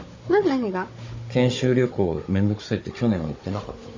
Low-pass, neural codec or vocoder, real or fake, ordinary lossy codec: 7.2 kHz; codec, 16 kHz, 16 kbps, FunCodec, trained on Chinese and English, 50 frames a second; fake; MP3, 32 kbps